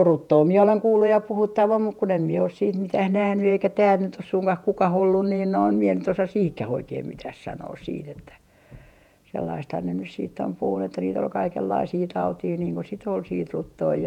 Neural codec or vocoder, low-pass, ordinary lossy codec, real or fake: vocoder, 48 kHz, 128 mel bands, Vocos; 19.8 kHz; none; fake